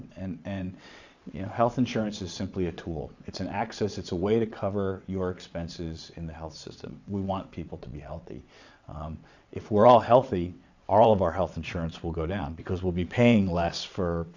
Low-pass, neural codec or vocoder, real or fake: 7.2 kHz; vocoder, 22.05 kHz, 80 mel bands, WaveNeXt; fake